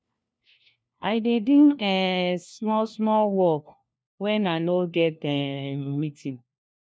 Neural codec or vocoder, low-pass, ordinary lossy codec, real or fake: codec, 16 kHz, 1 kbps, FunCodec, trained on LibriTTS, 50 frames a second; none; none; fake